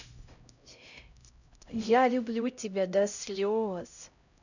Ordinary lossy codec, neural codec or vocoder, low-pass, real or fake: none; codec, 16 kHz, 0.5 kbps, X-Codec, HuBERT features, trained on LibriSpeech; 7.2 kHz; fake